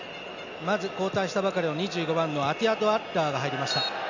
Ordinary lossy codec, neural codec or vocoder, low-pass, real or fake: none; none; 7.2 kHz; real